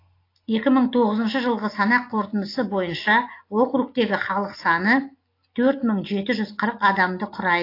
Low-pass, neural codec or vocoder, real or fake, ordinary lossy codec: 5.4 kHz; none; real; AAC, 32 kbps